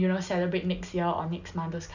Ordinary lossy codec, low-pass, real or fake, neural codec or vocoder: none; 7.2 kHz; real; none